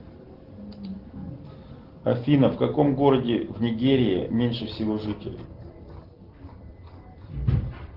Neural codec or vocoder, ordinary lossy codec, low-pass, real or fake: none; Opus, 16 kbps; 5.4 kHz; real